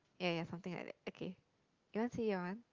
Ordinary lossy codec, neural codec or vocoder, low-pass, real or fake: Opus, 32 kbps; none; 7.2 kHz; real